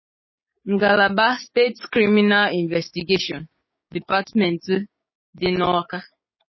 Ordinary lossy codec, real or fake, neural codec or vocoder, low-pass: MP3, 24 kbps; fake; codec, 16 kHz, 6 kbps, DAC; 7.2 kHz